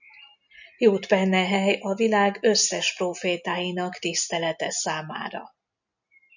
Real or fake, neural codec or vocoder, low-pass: real; none; 7.2 kHz